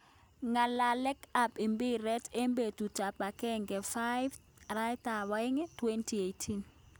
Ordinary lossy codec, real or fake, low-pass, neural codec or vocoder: none; real; none; none